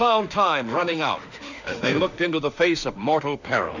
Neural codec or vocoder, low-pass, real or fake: autoencoder, 48 kHz, 32 numbers a frame, DAC-VAE, trained on Japanese speech; 7.2 kHz; fake